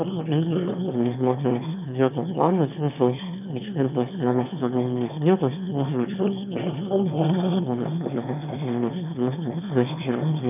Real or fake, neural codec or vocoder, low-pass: fake; autoencoder, 22.05 kHz, a latent of 192 numbers a frame, VITS, trained on one speaker; 3.6 kHz